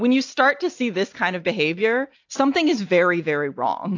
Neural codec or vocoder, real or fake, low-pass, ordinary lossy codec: none; real; 7.2 kHz; AAC, 48 kbps